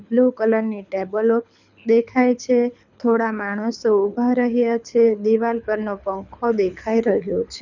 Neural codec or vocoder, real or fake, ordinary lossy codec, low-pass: codec, 24 kHz, 6 kbps, HILCodec; fake; none; 7.2 kHz